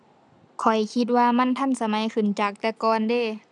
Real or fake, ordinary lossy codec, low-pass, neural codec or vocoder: real; none; none; none